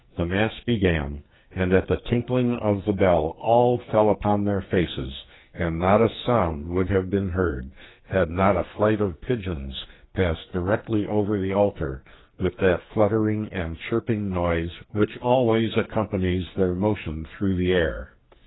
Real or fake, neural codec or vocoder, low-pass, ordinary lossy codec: fake; codec, 44.1 kHz, 2.6 kbps, SNAC; 7.2 kHz; AAC, 16 kbps